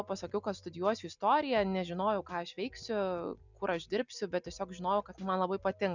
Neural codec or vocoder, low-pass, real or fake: none; 7.2 kHz; real